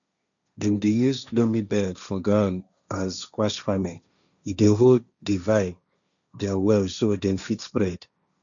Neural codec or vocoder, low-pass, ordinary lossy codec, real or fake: codec, 16 kHz, 1.1 kbps, Voila-Tokenizer; 7.2 kHz; none; fake